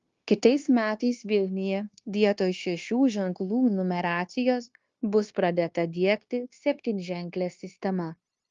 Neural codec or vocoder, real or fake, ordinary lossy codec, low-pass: codec, 16 kHz, 0.9 kbps, LongCat-Audio-Codec; fake; Opus, 32 kbps; 7.2 kHz